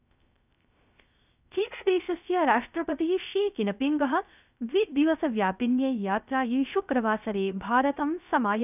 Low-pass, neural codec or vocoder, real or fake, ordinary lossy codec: 3.6 kHz; codec, 16 kHz, 0.3 kbps, FocalCodec; fake; none